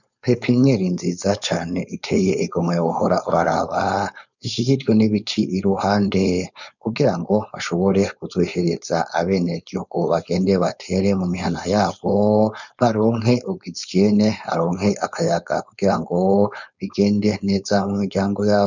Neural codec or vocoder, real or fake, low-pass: codec, 16 kHz, 4.8 kbps, FACodec; fake; 7.2 kHz